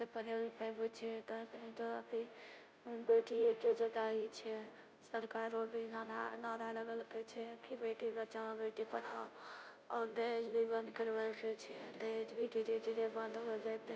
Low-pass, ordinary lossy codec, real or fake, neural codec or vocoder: none; none; fake; codec, 16 kHz, 0.5 kbps, FunCodec, trained on Chinese and English, 25 frames a second